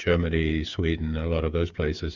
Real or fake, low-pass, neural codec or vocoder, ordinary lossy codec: fake; 7.2 kHz; codec, 16 kHz, 8 kbps, FreqCodec, smaller model; Opus, 64 kbps